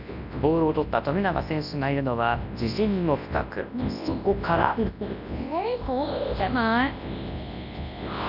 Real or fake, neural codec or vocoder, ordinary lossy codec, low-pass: fake; codec, 24 kHz, 0.9 kbps, WavTokenizer, large speech release; none; 5.4 kHz